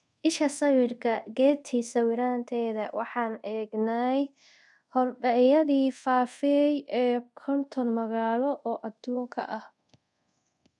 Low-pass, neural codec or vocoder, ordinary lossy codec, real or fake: 10.8 kHz; codec, 24 kHz, 0.5 kbps, DualCodec; none; fake